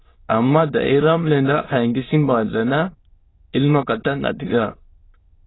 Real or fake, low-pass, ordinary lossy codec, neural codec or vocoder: fake; 7.2 kHz; AAC, 16 kbps; autoencoder, 22.05 kHz, a latent of 192 numbers a frame, VITS, trained on many speakers